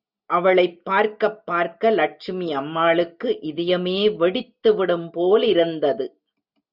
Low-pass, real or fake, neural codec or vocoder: 5.4 kHz; real; none